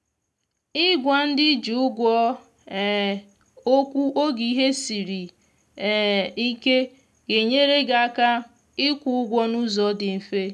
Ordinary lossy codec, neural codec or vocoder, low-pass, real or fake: none; none; none; real